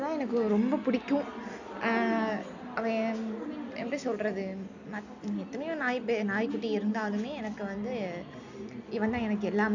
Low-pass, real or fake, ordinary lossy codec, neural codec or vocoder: 7.2 kHz; real; none; none